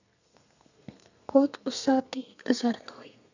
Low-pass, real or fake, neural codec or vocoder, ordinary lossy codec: 7.2 kHz; fake; codec, 32 kHz, 1.9 kbps, SNAC; none